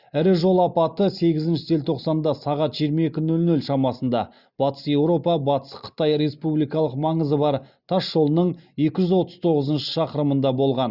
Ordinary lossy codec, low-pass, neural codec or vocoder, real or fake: Opus, 64 kbps; 5.4 kHz; none; real